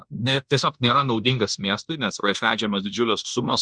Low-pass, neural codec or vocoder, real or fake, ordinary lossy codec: 9.9 kHz; codec, 16 kHz in and 24 kHz out, 0.9 kbps, LongCat-Audio-Codec, fine tuned four codebook decoder; fake; Opus, 64 kbps